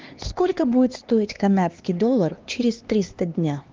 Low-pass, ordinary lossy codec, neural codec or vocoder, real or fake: 7.2 kHz; Opus, 32 kbps; codec, 16 kHz, 2 kbps, X-Codec, HuBERT features, trained on LibriSpeech; fake